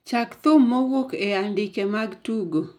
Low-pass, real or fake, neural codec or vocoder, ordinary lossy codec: 19.8 kHz; real; none; none